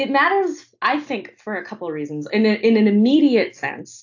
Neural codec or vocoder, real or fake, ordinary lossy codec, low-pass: none; real; AAC, 48 kbps; 7.2 kHz